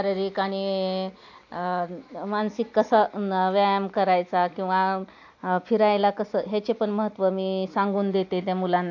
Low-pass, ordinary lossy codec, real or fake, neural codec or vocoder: 7.2 kHz; AAC, 48 kbps; real; none